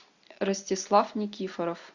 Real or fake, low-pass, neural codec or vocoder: real; 7.2 kHz; none